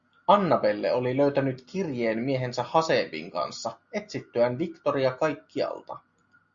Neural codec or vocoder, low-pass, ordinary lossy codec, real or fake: none; 7.2 kHz; Opus, 64 kbps; real